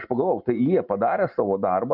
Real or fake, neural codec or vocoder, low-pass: real; none; 5.4 kHz